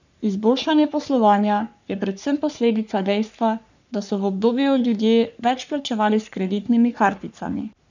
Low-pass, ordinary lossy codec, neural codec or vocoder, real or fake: 7.2 kHz; none; codec, 44.1 kHz, 3.4 kbps, Pupu-Codec; fake